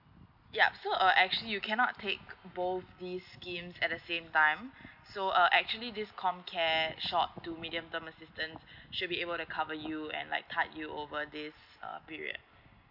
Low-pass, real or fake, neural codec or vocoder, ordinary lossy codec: 5.4 kHz; real; none; none